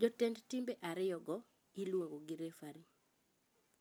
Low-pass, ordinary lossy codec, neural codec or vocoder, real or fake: none; none; none; real